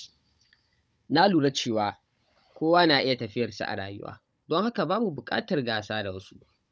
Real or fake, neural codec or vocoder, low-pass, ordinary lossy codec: fake; codec, 16 kHz, 16 kbps, FunCodec, trained on Chinese and English, 50 frames a second; none; none